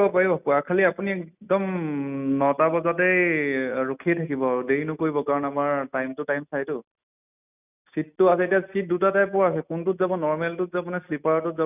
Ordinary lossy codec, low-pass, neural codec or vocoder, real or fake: none; 3.6 kHz; none; real